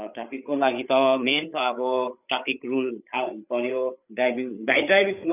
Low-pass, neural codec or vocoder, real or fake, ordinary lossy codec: 3.6 kHz; codec, 16 kHz, 8 kbps, FreqCodec, larger model; fake; none